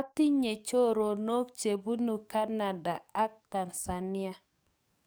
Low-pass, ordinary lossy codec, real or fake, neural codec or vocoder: none; none; fake; codec, 44.1 kHz, 7.8 kbps, DAC